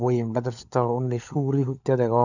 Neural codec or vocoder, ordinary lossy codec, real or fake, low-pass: codec, 16 kHz, 16 kbps, FunCodec, trained on LibriTTS, 50 frames a second; none; fake; 7.2 kHz